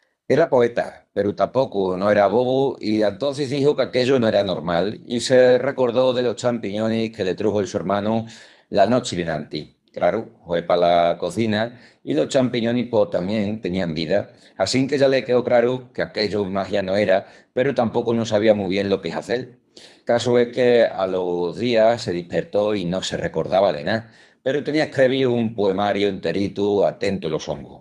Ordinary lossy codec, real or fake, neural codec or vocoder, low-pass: none; fake; codec, 24 kHz, 3 kbps, HILCodec; none